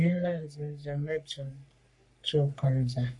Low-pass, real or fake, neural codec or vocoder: 10.8 kHz; fake; codec, 44.1 kHz, 3.4 kbps, Pupu-Codec